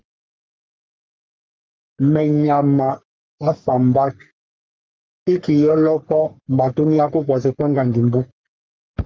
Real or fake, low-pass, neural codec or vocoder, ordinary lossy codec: fake; 7.2 kHz; codec, 44.1 kHz, 3.4 kbps, Pupu-Codec; Opus, 24 kbps